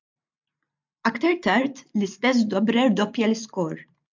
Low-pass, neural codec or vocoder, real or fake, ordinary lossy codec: 7.2 kHz; none; real; MP3, 64 kbps